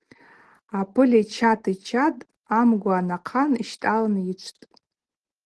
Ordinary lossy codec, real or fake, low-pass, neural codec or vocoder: Opus, 16 kbps; real; 10.8 kHz; none